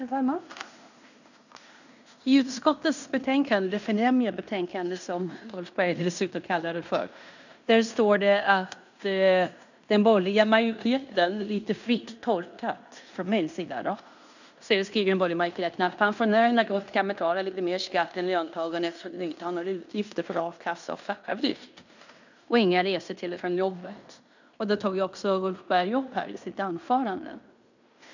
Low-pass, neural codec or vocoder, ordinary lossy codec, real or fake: 7.2 kHz; codec, 16 kHz in and 24 kHz out, 0.9 kbps, LongCat-Audio-Codec, fine tuned four codebook decoder; none; fake